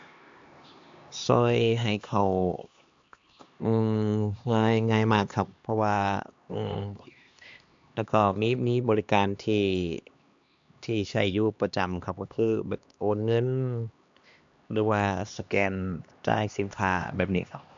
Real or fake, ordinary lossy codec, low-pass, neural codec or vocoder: fake; AAC, 64 kbps; 7.2 kHz; codec, 16 kHz, 2 kbps, X-Codec, HuBERT features, trained on LibriSpeech